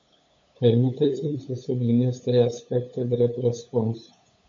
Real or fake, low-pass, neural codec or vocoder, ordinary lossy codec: fake; 7.2 kHz; codec, 16 kHz, 8 kbps, FunCodec, trained on LibriTTS, 25 frames a second; MP3, 48 kbps